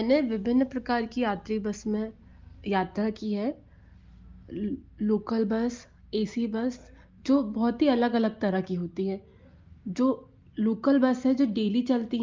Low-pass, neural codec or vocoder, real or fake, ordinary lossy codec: 7.2 kHz; none; real; Opus, 24 kbps